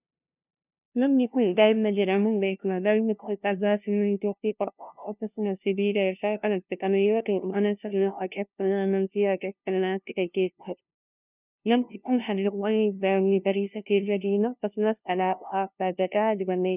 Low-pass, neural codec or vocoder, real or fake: 3.6 kHz; codec, 16 kHz, 0.5 kbps, FunCodec, trained on LibriTTS, 25 frames a second; fake